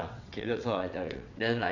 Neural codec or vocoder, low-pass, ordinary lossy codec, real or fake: vocoder, 22.05 kHz, 80 mel bands, WaveNeXt; 7.2 kHz; none; fake